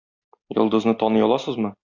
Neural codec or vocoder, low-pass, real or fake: none; 7.2 kHz; real